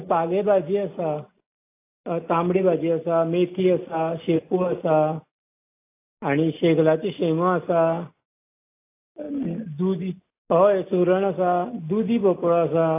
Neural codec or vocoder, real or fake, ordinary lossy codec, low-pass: none; real; AAC, 24 kbps; 3.6 kHz